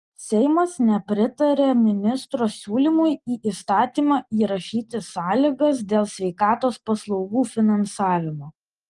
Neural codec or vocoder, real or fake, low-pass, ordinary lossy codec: none; real; 10.8 kHz; Opus, 24 kbps